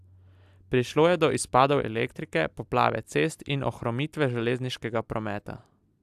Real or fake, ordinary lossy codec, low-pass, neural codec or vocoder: real; AAC, 96 kbps; 14.4 kHz; none